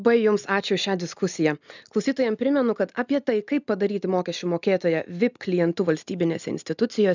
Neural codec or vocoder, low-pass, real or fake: none; 7.2 kHz; real